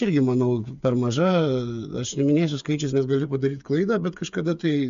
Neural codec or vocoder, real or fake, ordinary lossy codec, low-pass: codec, 16 kHz, 8 kbps, FreqCodec, smaller model; fake; MP3, 64 kbps; 7.2 kHz